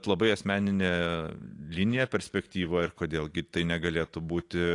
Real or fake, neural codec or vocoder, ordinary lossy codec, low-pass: real; none; AAC, 48 kbps; 10.8 kHz